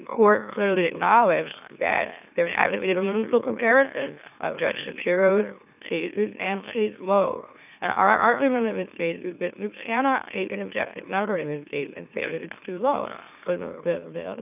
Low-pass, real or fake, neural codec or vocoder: 3.6 kHz; fake; autoencoder, 44.1 kHz, a latent of 192 numbers a frame, MeloTTS